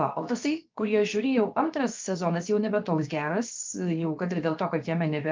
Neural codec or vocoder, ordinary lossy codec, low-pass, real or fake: codec, 16 kHz, 0.7 kbps, FocalCodec; Opus, 32 kbps; 7.2 kHz; fake